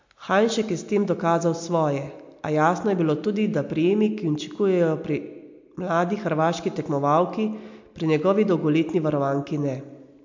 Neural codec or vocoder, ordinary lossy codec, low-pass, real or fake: none; MP3, 48 kbps; 7.2 kHz; real